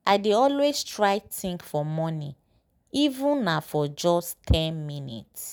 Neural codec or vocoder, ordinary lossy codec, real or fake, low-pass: none; none; real; none